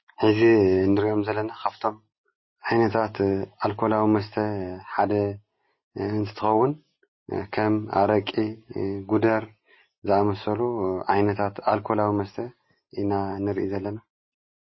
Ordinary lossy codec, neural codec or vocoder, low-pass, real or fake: MP3, 24 kbps; none; 7.2 kHz; real